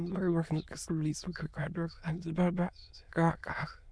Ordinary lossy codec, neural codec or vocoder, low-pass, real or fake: none; autoencoder, 22.05 kHz, a latent of 192 numbers a frame, VITS, trained on many speakers; none; fake